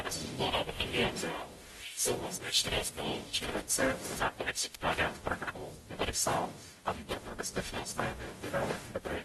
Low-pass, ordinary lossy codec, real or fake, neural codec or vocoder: 19.8 kHz; AAC, 32 kbps; fake; codec, 44.1 kHz, 0.9 kbps, DAC